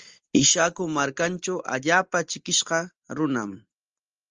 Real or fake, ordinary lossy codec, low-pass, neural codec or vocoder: real; Opus, 24 kbps; 7.2 kHz; none